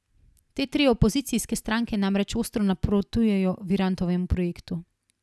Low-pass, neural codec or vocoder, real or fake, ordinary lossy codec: none; none; real; none